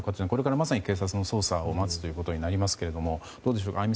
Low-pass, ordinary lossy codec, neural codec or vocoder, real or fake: none; none; none; real